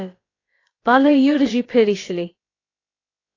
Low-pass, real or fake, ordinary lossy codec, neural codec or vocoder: 7.2 kHz; fake; AAC, 32 kbps; codec, 16 kHz, about 1 kbps, DyCAST, with the encoder's durations